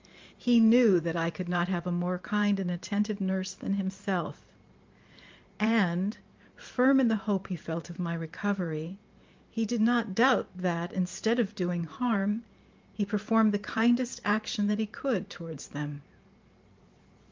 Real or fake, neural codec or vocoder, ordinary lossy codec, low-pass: fake; vocoder, 44.1 kHz, 128 mel bands every 512 samples, BigVGAN v2; Opus, 32 kbps; 7.2 kHz